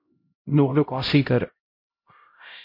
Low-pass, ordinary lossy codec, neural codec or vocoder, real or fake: 5.4 kHz; MP3, 32 kbps; codec, 16 kHz, 0.5 kbps, X-Codec, HuBERT features, trained on LibriSpeech; fake